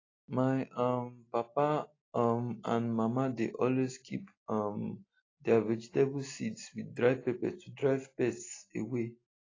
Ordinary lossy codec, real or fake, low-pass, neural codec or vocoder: AAC, 32 kbps; real; 7.2 kHz; none